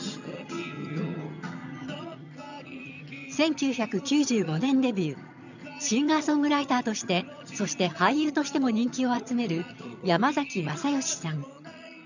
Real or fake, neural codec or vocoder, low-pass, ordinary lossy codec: fake; vocoder, 22.05 kHz, 80 mel bands, HiFi-GAN; 7.2 kHz; none